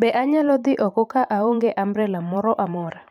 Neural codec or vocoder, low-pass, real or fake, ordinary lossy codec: vocoder, 44.1 kHz, 128 mel bands every 512 samples, BigVGAN v2; 19.8 kHz; fake; none